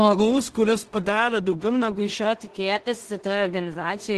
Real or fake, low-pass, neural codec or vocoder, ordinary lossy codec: fake; 10.8 kHz; codec, 16 kHz in and 24 kHz out, 0.4 kbps, LongCat-Audio-Codec, two codebook decoder; Opus, 16 kbps